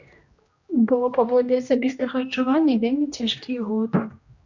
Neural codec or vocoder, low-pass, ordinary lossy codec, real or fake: codec, 16 kHz, 1 kbps, X-Codec, HuBERT features, trained on general audio; 7.2 kHz; Opus, 64 kbps; fake